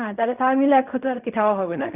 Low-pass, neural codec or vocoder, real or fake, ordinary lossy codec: 3.6 kHz; codec, 16 kHz in and 24 kHz out, 0.4 kbps, LongCat-Audio-Codec, fine tuned four codebook decoder; fake; Opus, 64 kbps